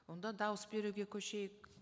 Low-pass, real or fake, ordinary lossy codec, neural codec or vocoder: none; real; none; none